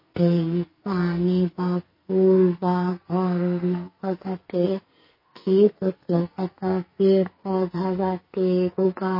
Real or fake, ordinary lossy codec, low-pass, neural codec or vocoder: fake; MP3, 24 kbps; 5.4 kHz; codec, 44.1 kHz, 2.6 kbps, SNAC